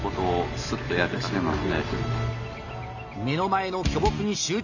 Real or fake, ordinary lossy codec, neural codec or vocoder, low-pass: real; none; none; 7.2 kHz